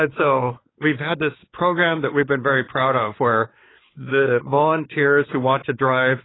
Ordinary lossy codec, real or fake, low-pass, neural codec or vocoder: AAC, 16 kbps; fake; 7.2 kHz; codec, 16 kHz, 4 kbps, X-Codec, WavLM features, trained on Multilingual LibriSpeech